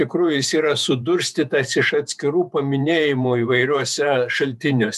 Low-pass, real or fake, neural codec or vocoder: 14.4 kHz; fake; vocoder, 48 kHz, 128 mel bands, Vocos